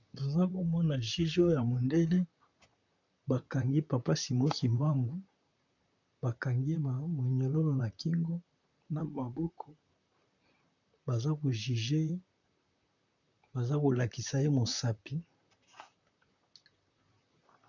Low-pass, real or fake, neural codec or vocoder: 7.2 kHz; fake; vocoder, 44.1 kHz, 128 mel bands, Pupu-Vocoder